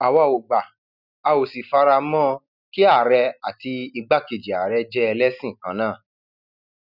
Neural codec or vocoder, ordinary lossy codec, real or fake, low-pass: none; none; real; 5.4 kHz